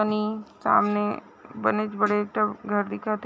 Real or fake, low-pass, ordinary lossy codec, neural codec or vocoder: real; none; none; none